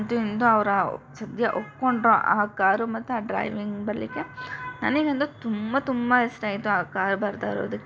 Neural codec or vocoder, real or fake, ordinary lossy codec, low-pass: none; real; none; none